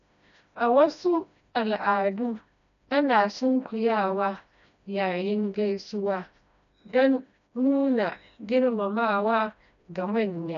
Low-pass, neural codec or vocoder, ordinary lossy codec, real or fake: 7.2 kHz; codec, 16 kHz, 1 kbps, FreqCodec, smaller model; none; fake